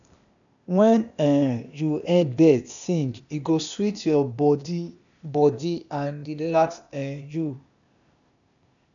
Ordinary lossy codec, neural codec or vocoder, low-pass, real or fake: none; codec, 16 kHz, 0.8 kbps, ZipCodec; 7.2 kHz; fake